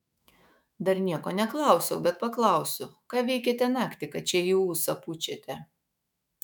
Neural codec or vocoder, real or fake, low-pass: autoencoder, 48 kHz, 128 numbers a frame, DAC-VAE, trained on Japanese speech; fake; 19.8 kHz